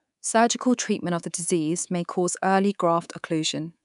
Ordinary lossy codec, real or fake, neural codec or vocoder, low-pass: none; fake; codec, 24 kHz, 3.1 kbps, DualCodec; 10.8 kHz